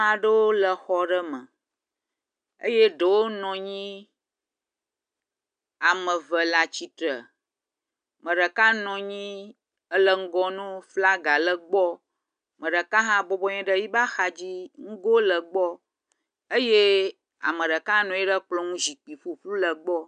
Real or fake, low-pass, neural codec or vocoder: real; 9.9 kHz; none